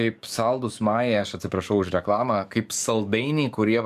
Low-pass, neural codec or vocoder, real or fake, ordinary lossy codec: 14.4 kHz; codec, 44.1 kHz, 7.8 kbps, DAC; fake; MP3, 96 kbps